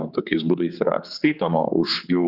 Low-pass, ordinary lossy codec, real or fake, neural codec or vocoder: 5.4 kHz; Opus, 64 kbps; fake; codec, 16 kHz, 4 kbps, X-Codec, HuBERT features, trained on general audio